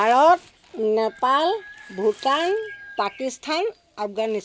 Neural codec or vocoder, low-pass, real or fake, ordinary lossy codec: none; none; real; none